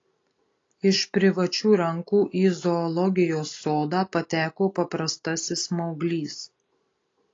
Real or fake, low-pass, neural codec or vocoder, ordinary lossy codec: real; 7.2 kHz; none; AAC, 32 kbps